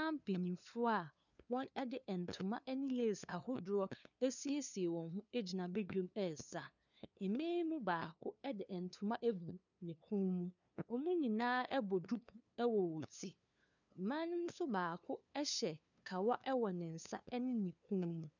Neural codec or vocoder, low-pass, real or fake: codec, 16 kHz, 2 kbps, FunCodec, trained on LibriTTS, 25 frames a second; 7.2 kHz; fake